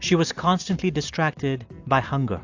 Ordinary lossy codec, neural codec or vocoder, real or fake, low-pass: AAC, 48 kbps; none; real; 7.2 kHz